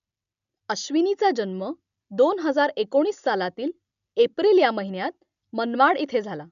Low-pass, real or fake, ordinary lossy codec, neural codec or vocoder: 7.2 kHz; real; MP3, 96 kbps; none